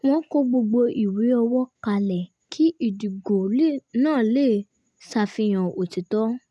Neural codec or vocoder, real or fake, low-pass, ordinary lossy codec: none; real; none; none